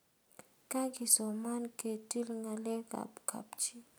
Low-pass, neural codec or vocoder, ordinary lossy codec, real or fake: none; none; none; real